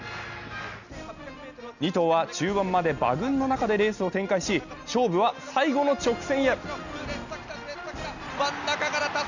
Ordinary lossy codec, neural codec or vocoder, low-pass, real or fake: none; none; 7.2 kHz; real